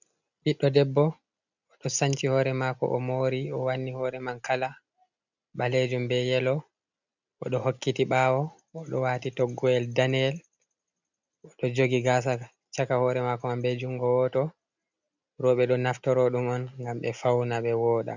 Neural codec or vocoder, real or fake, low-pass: none; real; 7.2 kHz